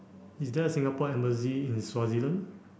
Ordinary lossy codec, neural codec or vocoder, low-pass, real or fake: none; none; none; real